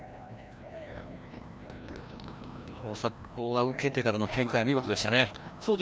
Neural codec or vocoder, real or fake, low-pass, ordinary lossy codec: codec, 16 kHz, 1 kbps, FreqCodec, larger model; fake; none; none